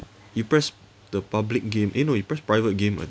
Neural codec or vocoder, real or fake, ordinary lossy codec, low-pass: none; real; none; none